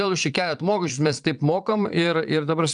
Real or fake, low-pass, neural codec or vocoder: fake; 9.9 kHz; vocoder, 22.05 kHz, 80 mel bands, Vocos